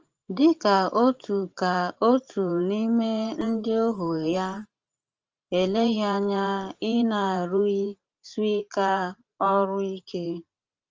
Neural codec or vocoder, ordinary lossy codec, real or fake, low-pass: vocoder, 44.1 kHz, 128 mel bands every 512 samples, BigVGAN v2; Opus, 24 kbps; fake; 7.2 kHz